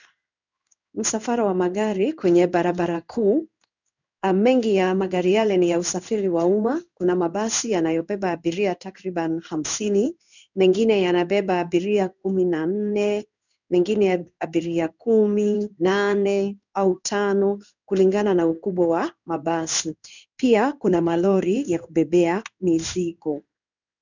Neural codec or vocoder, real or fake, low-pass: codec, 16 kHz in and 24 kHz out, 1 kbps, XY-Tokenizer; fake; 7.2 kHz